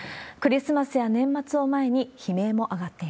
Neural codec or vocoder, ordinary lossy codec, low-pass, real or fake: none; none; none; real